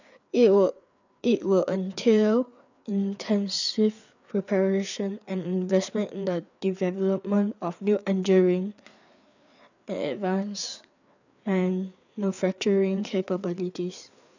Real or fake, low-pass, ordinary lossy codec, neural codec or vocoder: fake; 7.2 kHz; none; codec, 16 kHz, 4 kbps, FreqCodec, larger model